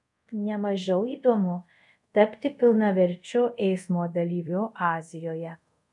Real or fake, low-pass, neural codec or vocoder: fake; 10.8 kHz; codec, 24 kHz, 0.5 kbps, DualCodec